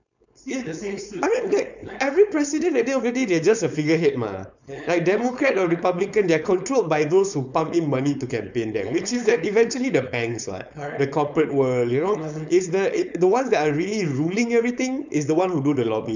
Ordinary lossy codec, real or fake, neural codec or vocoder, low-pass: none; fake; codec, 16 kHz, 4.8 kbps, FACodec; 7.2 kHz